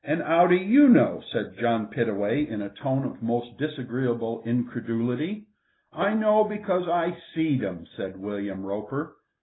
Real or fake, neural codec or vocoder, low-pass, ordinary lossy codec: real; none; 7.2 kHz; AAC, 16 kbps